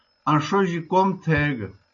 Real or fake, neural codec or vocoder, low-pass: real; none; 7.2 kHz